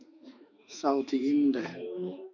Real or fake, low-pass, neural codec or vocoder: fake; 7.2 kHz; autoencoder, 48 kHz, 32 numbers a frame, DAC-VAE, trained on Japanese speech